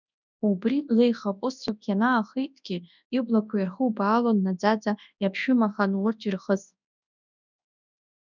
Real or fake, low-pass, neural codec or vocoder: fake; 7.2 kHz; codec, 24 kHz, 0.9 kbps, WavTokenizer, large speech release